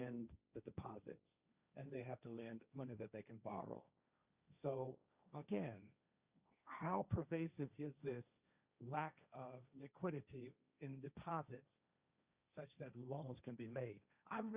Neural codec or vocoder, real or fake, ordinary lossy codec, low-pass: codec, 16 kHz, 1.1 kbps, Voila-Tokenizer; fake; Opus, 64 kbps; 3.6 kHz